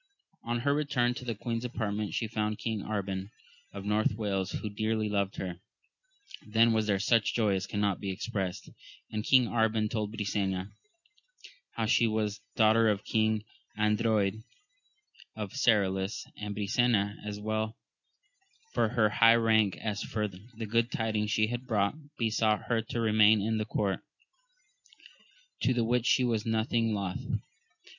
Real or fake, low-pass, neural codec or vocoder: real; 7.2 kHz; none